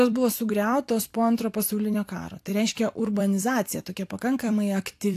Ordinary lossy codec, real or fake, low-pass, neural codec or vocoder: AAC, 64 kbps; fake; 14.4 kHz; vocoder, 44.1 kHz, 128 mel bands every 256 samples, BigVGAN v2